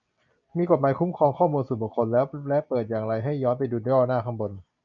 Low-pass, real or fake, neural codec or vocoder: 7.2 kHz; real; none